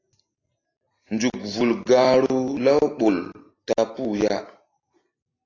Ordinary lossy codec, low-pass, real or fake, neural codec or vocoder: AAC, 32 kbps; 7.2 kHz; real; none